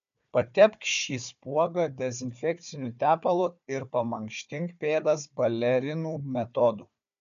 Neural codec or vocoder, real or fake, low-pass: codec, 16 kHz, 4 kbps, FunCodec, trained on Chinese and English, 50 frames a second; fake; 7.2 kHz